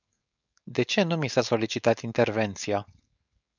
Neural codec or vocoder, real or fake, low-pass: codec, 16 kHz, 4.8 kbps, FACodec; fake; 7.2 kHz